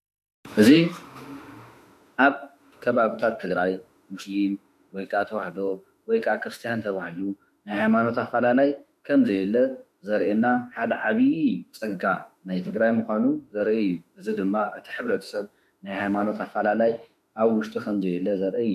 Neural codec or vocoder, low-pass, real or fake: autoencoder, 48 kHz, 32 numbers a frame, DAC-VAE, trained on Japanese speech; 14.4 kHz; fake